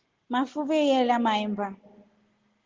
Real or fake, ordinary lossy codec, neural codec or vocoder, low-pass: real; Opus, 16 kbps; none; 7.2 kHz